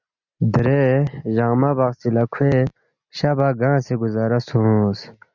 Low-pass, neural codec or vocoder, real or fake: 7.2 kHz; none; real